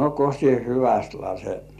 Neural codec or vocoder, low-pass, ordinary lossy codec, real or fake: none; 14.4 kHz; none; real